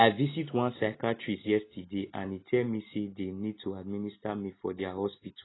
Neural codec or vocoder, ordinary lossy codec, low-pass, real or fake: none; AAC, 16 kbps; 7.2 kHz; real